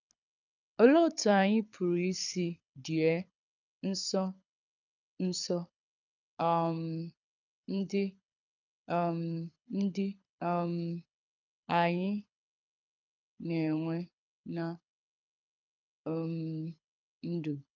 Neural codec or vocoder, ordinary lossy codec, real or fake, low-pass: codec, 24 kHz, 6 kbps, HILCodec; none; fake; 7.2 kHz